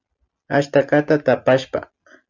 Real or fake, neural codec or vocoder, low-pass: real; none; 7.2 kHz